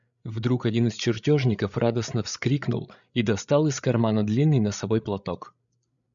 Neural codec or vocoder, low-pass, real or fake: codec, 16 kHz, 8 kbps, FreqCodec, larger model; 7.2 kHz; fake